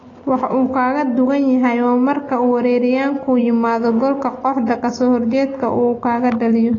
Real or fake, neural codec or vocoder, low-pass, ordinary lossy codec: real; none; 7.2 kHz; AAC, 32 kbps